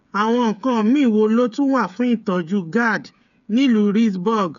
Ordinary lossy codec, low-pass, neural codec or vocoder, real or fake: none; 7.2 kHz; codec, 16 kHz, 8 kbps, FreqCodec, smaller model; fake